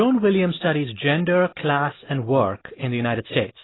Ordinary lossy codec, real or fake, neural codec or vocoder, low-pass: AAC, 16 kbps; real; none; 7.2 kHz